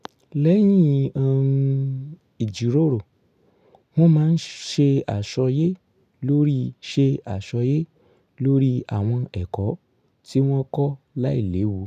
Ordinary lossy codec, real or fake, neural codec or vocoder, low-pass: none; real; none; 14.4 kHz